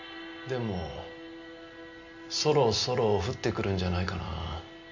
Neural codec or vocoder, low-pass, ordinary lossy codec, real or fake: none; 7.2 kHz; none; real